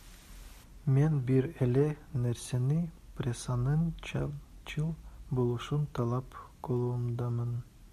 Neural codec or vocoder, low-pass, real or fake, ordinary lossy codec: none; 14.4 kHz; real; AAC, 96 kbps